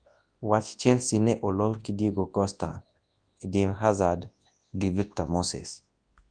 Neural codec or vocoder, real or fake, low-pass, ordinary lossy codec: codec, 24 kHz, 0.9 kbps, WavTokenizer, large speech release; fake; 9.9 kHz; Opus, 32 kbps